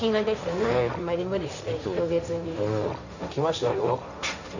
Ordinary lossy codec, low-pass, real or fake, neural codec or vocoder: none; 7.2 kHz; fake; codec, 16 kHz, 1.1 kbps, Voila-Tokenizer